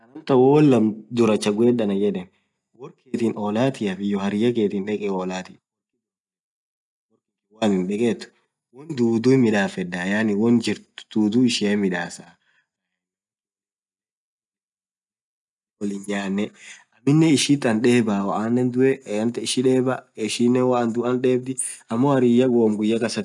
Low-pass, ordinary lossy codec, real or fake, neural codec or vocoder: 10.8 kHz; none; real; none